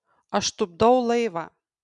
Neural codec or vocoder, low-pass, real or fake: none; 10.8 kHz; real